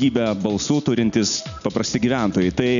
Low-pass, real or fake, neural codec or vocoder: 7.2 kHz; real; none